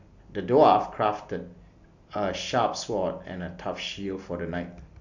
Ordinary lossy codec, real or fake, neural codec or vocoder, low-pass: none; real; none; 7.2 kHz